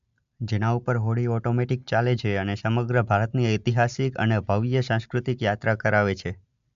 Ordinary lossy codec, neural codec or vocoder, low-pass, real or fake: MP3, 64 kbps; none; 7.2 kHz; real